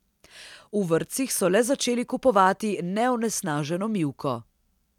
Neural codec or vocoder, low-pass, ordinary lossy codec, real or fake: none; 19.8 kHz; none; real